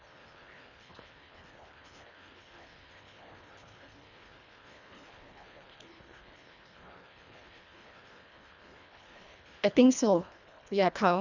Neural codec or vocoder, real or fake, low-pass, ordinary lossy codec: codec, 24 kHz, 1.5 kbps, HILCodec; fake; 7.2 kHz; none